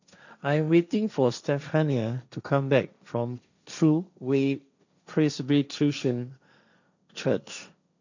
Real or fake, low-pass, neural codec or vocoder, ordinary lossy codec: fake; 7.2 kHz; codec, 16 kHz, 1.1 kbps, Voila-Tokenizer; none